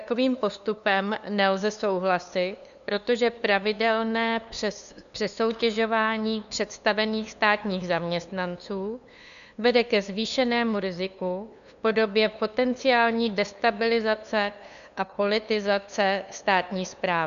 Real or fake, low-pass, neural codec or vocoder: fake; 7.2 kHz; codec, 16 kHz, 2 kbps, FunCodec, trained on LibriTTS, 25 frames a second